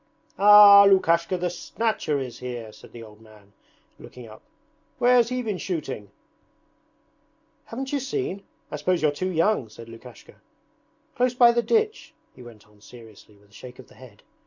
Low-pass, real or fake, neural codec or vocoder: 7.2 kHz; real; none